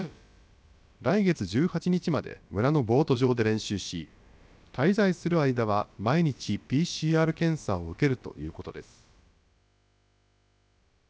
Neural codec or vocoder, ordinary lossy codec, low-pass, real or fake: codec, 16 kHz, about 1 kbps, DyCAST, with the encoder's durations; none; none; fake